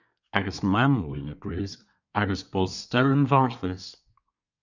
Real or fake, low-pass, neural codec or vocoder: fake; 7.2 kHz; codec, 24 kHz, 1 kbps, SNAC